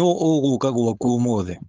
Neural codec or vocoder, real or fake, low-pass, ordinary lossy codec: codec, 16 kHz, 4.8 kbps, FACodec; fake; 7.2 kHz; Opus, 24 kbps